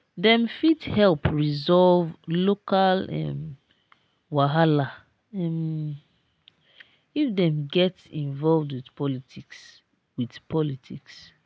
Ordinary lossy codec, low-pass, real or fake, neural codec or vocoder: none; none; real; none